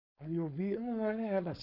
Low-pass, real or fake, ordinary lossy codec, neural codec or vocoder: 5.4 kHz; fake; none; codec, 16 kHz, 4.8 kbps, FACodec